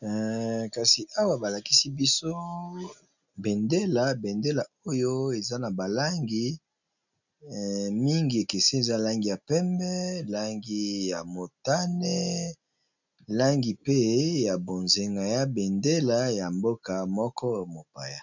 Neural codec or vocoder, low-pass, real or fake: none; 7.2 kHz; real